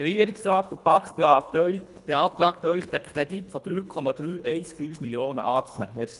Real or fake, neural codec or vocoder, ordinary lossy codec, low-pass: fake; codec, 24 kHz, 1.5 kbps, HILCodec; none; 10.8 kHz